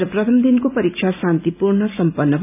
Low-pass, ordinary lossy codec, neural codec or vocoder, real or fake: 3.6 kHz; none; none; real